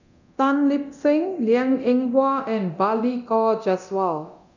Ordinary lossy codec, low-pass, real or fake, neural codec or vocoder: none; 7.2 kHz; fake; codec, 24 kHz, 0.9 kbps, DualCodec